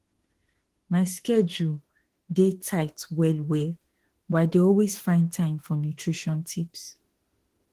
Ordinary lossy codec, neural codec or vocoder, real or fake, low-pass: Opus, 16 kbps; autoencoder, 48 kHz, 32 numbers a frame, DAC-VAE, trained on Japanese speech; fake; 14.4 kHz